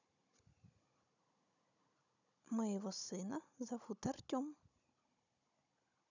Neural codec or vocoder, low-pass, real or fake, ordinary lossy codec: codec, 16 kHz, 16 kbps, FunCodec, trained on Chinese and English, 50 frames a second; 7.2 kHz; fake; none